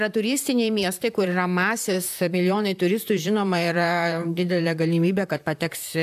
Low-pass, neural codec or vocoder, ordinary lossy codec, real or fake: 14.4 kHz; vocoder, 44.1 kHz, 128 mel bands, Pupu-Vocoder; AAC, 96 kbps; fake